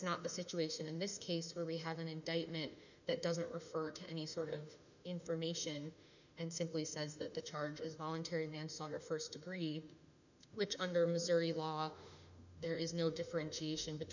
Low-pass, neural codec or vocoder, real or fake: 7.2 kHz; autoencoder, 48 kHz, 32 numbers a frame, DAC-VAE, trained on Japanese speech; fake